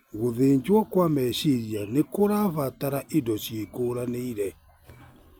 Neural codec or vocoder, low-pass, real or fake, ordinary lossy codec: none; none; real; none